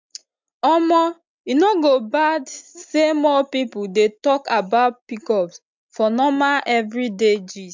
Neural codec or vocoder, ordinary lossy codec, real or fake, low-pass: none; MP3, 64 kbps; real; 7.2 kHz